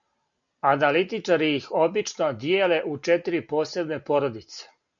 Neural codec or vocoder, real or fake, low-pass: none; real; 7.2 kHz